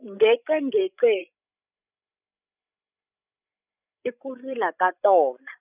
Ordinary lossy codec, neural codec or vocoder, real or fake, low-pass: none; codec, 16 kHz, 16 kbps, FreqCodec, larger model; fake; 3.6 kHz